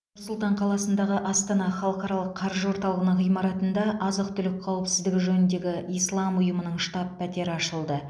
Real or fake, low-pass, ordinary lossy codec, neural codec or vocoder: real; none; none; none